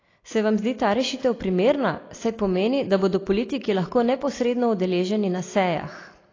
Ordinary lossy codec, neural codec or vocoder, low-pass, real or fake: AAC, 32 kbps; none; 7.2 kHz; real